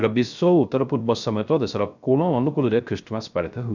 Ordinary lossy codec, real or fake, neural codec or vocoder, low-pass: none; fake; codec, 16 kHz, 0.3 kbps, FocalCodec; 7.2 kHz